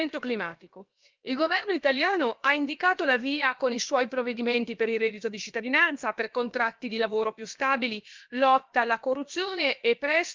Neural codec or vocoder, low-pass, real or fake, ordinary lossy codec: codec, 16 kHz, about 1 kbps, DyCAST, with the encoder's durations; 7.2 kHz; fake; Opus, 24 kbps